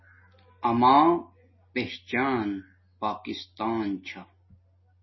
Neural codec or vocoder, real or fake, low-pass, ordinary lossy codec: none; real; 7.2 kHz; MP3, 24 kbps